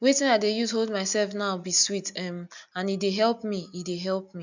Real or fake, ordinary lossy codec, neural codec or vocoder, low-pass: real; none; none; 7.2 kHz